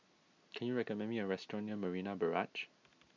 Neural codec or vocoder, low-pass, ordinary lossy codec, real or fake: none; 7.2 kHz; MP3, 64 kbps; real